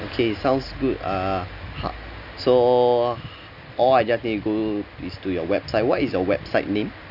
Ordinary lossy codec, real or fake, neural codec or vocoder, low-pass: none; real; none; 5.4 kHz